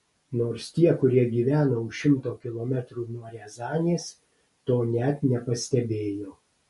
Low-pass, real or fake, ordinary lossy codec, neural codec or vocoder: 14.4 kHz; real; MP3, 48 kbps; none